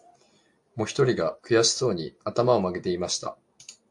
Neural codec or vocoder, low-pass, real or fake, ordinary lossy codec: none; 10.8 kHz; real; AAC, 64 kbps